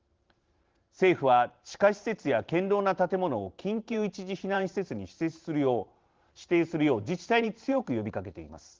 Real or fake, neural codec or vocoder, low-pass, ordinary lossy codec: fake; vocoder, 44.1 kHz, 128 mel bands every 512 samples, BigVGAN v2; 7.2 kHz; Opus, 16 kbps